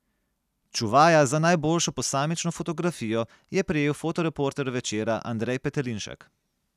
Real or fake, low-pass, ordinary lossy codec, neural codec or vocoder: real; 14.4 kHz; none; none